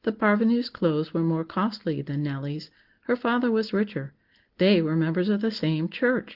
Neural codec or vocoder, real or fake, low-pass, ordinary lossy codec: none; real; 5.4 kHz; Opus, 16 kbps